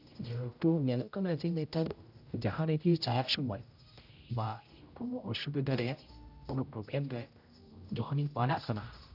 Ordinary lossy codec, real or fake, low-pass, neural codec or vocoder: none; fake; 5.4 kHz; codec, 16 kHz, 0.5 kbps, X-Codec, HuBERT features, trained on general audio